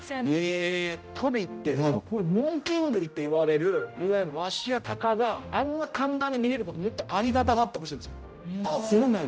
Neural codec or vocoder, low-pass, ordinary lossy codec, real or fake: codec, 16 kHz, 0.5 kbps, X-Codec, HuBERT features, trained on general audio; none; none; fake